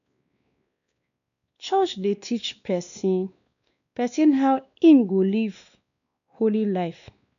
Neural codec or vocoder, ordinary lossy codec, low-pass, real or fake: codec, 16 kHz, 2 kbps, X-Codec, WavLM features, trained on Multilingual LibriSpeech; none; 7.2 kHz; fake